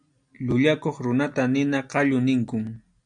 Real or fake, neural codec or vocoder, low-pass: real; none; 9.9 kHz